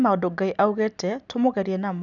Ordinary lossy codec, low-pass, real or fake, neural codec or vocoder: none; 7.2 kHz; real; none